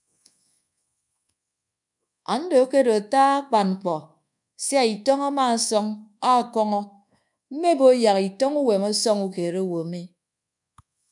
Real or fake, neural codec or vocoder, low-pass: fake; codec, 24 kHz, 1.2 kbps, DualCodec; 10.8 kHz